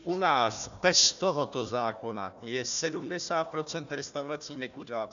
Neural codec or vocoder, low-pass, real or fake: codec, 16 kHz, 1 kbps, FunCodec, trained on Chinese and English, 50 frames a second; 7.2 kHz; fake